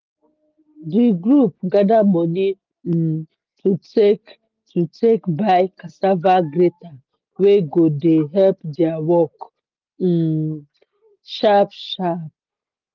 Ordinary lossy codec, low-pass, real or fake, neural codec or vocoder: Opus, 24 kbps; 7.2 kHz; real; none